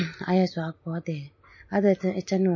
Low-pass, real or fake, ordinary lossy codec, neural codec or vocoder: 7.2 kHz; real; MP3, 32 kbps; none